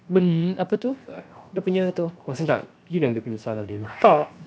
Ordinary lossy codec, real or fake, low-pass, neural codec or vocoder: none; fake; none; codec, 16 kHz, 0.7 kbps, FocalCodec